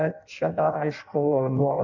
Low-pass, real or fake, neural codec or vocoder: 7.2 kHz; fake; codec, 16 kHz in and 24 kHz out, 0.6 kbps, FireRedTTS-2 codec